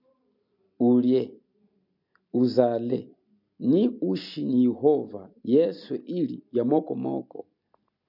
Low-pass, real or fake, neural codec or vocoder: 5.4 kHz; real; none